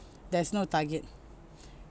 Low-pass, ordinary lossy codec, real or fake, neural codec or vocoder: none; none; real; none